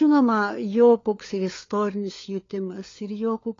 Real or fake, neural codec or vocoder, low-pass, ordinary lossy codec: fake; codec, 16 kHz, 4 kbps, FunCodec, trained on LibriTTS, 50 frames a second; 7.2 kHz; AAC, 32 kbps